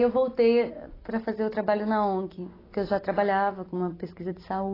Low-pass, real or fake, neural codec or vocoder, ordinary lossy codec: 5.4 kHz; real; none; AAC, 24 kbps